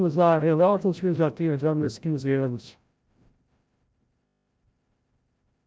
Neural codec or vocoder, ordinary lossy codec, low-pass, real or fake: codec, 16 kHz, 0.5 kbps, FreqCodec, larger model; none; none; fake